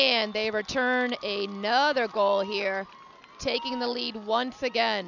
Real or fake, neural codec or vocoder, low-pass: real; none; 7.2 kHz